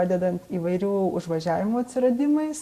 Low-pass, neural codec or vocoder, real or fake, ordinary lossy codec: 14.4 kHz; none; real; Opus, 64 kbps